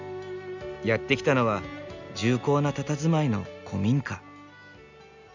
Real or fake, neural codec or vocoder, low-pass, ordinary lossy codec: real; none; 7.2 kHz; none